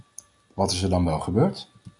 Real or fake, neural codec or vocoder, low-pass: real; none; 10.8 kHz